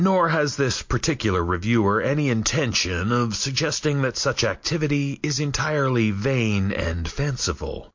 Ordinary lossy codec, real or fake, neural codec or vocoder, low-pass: MP3, 48 kbps; real; none; 7.2 kHz